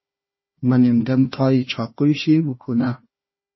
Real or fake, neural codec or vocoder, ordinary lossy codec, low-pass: fake; codec, 16 kHz, 1 kbps, FunCodec, trained on Chinese and English, 50 frames a second; MP3, 24 kbps; 7.2 kHz